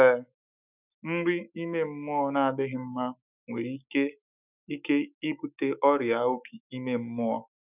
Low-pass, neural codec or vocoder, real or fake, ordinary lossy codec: 3.6 kHz; autoencoder, 48 kHz, 128 numbers a frame, DAC-VAE, trained on Japanese speech; fake; none